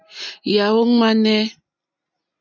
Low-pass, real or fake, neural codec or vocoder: 7.2 kHz; real; none